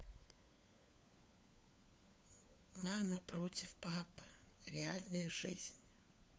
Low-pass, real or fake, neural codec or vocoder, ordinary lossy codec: none; fake; codec, 16 kHz, 4 kbps, FunCodec, trained on LibriTTS, 50 frames a second; none